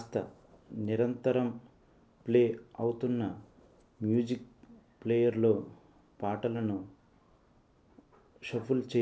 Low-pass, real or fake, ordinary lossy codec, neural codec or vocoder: none; real; none; none